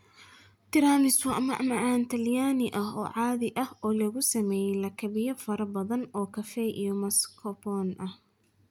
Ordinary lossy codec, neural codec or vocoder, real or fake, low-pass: none; none; real; none